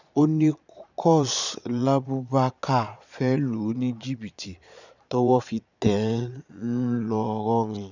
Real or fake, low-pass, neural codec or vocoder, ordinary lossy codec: fake; 7.2 kHz; vocoder, 22.05 kHz, 80 mel bands, Vocos; none